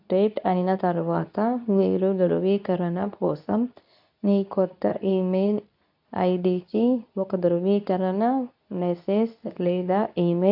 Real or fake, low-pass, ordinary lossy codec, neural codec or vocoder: fake; 5.4 kHz; AAC, 48 kbps; codec, 24 kHz, 0.9 kbps, WavTokenizer, medium speech release version 2